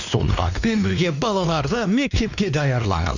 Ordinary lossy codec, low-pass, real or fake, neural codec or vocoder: none; 7.2 kHz; fake; codec, 16 kHz, 2 kbps, X-Codec, WavLM features, trained on Multilingual LibriSpeech